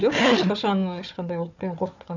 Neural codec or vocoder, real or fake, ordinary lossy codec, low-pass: codec, 16 kHz, 4 kbps, FunCodec, trained on Chinese and English, 50 frames a second; fake; none; 7.2 kHz